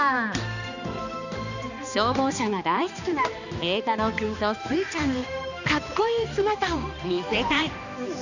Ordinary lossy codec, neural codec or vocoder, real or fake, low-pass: none; codec, 16 kHz, 2 kbps, X-Codec, HuBERT features, trained on balanced general audio; fake; 7.2 kHz